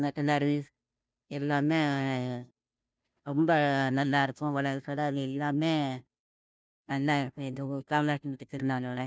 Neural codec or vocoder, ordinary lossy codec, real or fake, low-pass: codec, 16 kHz, 0.5 kbps, FunCodec, trained on Chinese and English, 25 frames a second; none; fake; none